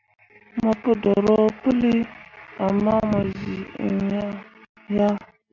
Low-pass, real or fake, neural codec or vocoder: 7.2 kHz; real; none